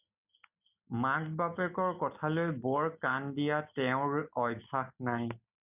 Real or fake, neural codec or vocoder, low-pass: real; none; 3.6 kHz